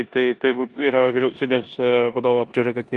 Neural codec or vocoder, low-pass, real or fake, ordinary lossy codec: codec, 16 kHz in and 24 kHz out, 0.9 kbps, LongCat-Audio-Codec, four codebook decoder; 10.8 kHz; fake; Opus, 32 kbps